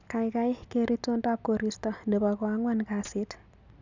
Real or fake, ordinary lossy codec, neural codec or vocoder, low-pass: real; none; none; 7.2 kHz